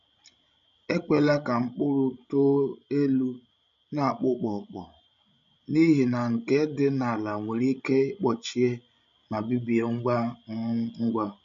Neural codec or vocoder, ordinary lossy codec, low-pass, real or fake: codec, 16 kHz, 16 kbps, FreqCodec, larger model; none; 7.2 kHz; fake